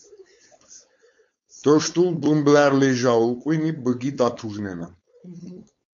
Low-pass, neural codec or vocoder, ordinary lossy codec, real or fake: 7.2 kHz; codec, 16 kHz, 4.8 kbps, FACodec; MP3, 64 kbps; fake